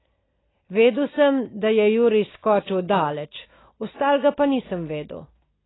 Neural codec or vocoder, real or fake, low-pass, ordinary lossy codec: none; real; 7.2 kHz; AAC, 16 kbps